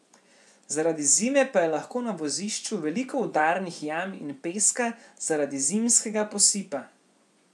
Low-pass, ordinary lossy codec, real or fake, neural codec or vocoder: none; none; fake; vocoder, 24 kHz, 100 mel bands, Vocos